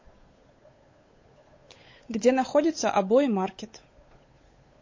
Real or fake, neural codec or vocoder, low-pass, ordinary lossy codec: fake; codec, 16 kHz, 8 kbps, FunCodec, trained on Chinese and English, 25 frames a second; 7.2 kHz; MP3, 32 kbps